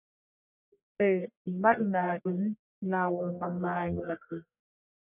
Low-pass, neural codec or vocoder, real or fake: 3.6 kHz; codec, 44.1 kHz, 1.7 kbps, Pupu-Codec; fake